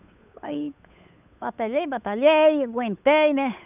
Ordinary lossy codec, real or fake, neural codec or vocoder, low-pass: none; fake; codec, 16 kHz, 8 kbps, FunCodec, trained on Chinese and English, 25 frames a second; 3.6 kHz